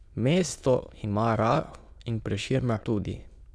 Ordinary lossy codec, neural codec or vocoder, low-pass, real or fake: none; autoencoder, 22.05 kHz, a latent of 192 numbers a frame, VITS, trained on many speakers; none; fake